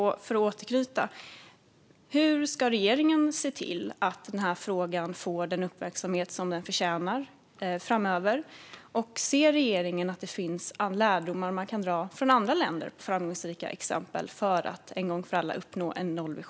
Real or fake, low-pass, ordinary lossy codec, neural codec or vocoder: real; none; none; none